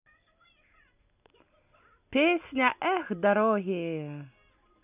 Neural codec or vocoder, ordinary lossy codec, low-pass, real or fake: none; none; 3.6 kHz; real